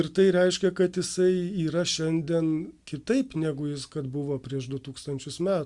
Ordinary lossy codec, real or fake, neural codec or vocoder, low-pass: Opus, 64 kbps; real; none; 10.8 kHz